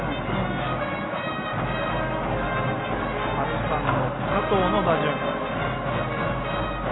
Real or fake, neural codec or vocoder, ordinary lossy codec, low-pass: real; none; AAC, 16 kbps; 7.2 kHz